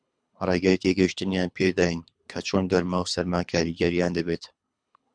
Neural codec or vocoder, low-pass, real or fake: codec, 24 kHz, 3 kbps, HILCodec; 9.9 kHz; fake